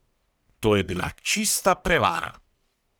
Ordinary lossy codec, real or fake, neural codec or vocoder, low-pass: none; fake; codec, 44.1 kHz, 1.7 kbps, Pupu-Codec; none